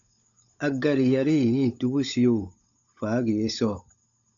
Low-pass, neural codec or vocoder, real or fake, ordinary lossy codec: 7.2 kHz; codec, 16 kHz, 16 kbps, FunCodec, trained on LibriTTS, 50 frames a second; fake; AAC, 64 kbps